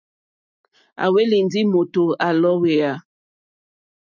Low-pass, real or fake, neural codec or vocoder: 7.2 kHz; real; none